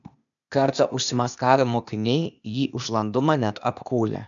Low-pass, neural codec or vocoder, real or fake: 7.2 kHz; codec, 16 kHz, 0.8 kbps, ZipCodec; fake